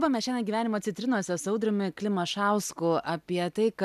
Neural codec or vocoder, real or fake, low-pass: none; real; 14.4 kHz